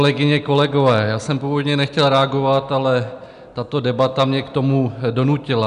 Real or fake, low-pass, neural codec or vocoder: real; 10.8 kHz; none